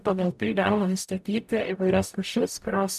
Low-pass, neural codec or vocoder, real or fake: 14.4 kHz; codec, 44.1 kHz, 0.9 kbps, DAC; fake